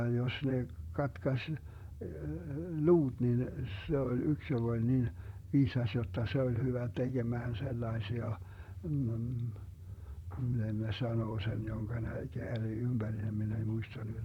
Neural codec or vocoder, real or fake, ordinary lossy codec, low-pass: vocoder, 44.1 kHz, 128 mel bands, Pupu-Vocoder; fake; none; 19.8 kHz